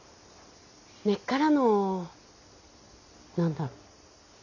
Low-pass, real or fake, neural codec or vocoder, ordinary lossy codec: 7.2 kHz; real; none; none